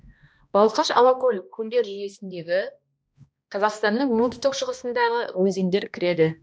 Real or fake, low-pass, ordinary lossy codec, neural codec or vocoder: fake; none; none; codec, 16 kHz, 1 kbps, X-Codec, HuBERT features, trained on balanced general audio